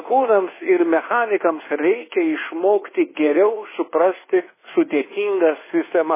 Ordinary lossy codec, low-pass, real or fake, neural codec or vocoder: MP3, 16 kbps; 3.6 kHz; fake; codec, 24 kHz, 1.2 kbps, DualCodec